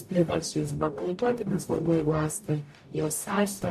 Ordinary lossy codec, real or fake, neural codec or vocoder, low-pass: MP3, 64 kbps; fake; codec, 44.1 kHz, 0.9 kbps, DAC; 14.4 kHz